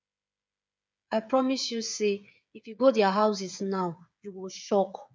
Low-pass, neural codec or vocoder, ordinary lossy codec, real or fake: none; codec, 16 kHz, 8 kbps, FreqCodec, smaller model; none; fake